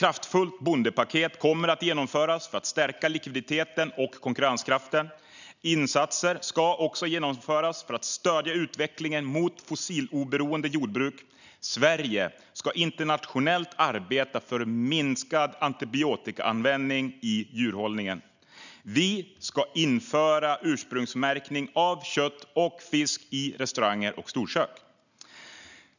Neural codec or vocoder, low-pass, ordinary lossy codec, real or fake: none; 7.2 kHz; none; real